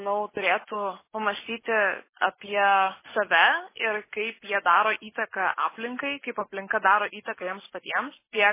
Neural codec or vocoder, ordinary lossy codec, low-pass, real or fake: none; MP3, 16 kbps; 3.6 kHz; real